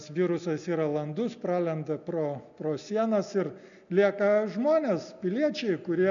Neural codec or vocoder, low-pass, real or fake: none; 7.2 kHz; real